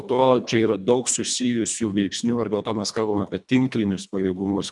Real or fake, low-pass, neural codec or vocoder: fake; 10.8 kHz; codec, 24 kHz, 1.5 kbps, HILCodec